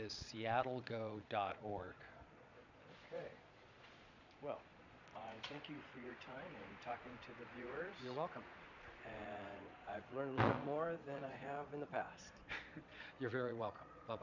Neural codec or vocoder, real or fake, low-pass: vocoder, 22.05 kHz, 80 mel bands, Vocos; fake; 7.2 kHz